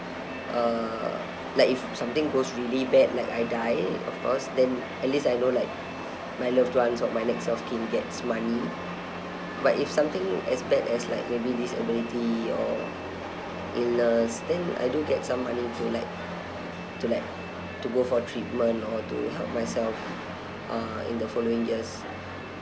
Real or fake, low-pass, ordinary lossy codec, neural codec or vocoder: real; none; none; none